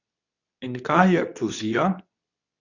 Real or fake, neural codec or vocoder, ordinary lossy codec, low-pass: fake; codec, 24 kHz, 0.9 kbps, WavTokenizer, medium speech release version 2; none; 7.2 kHz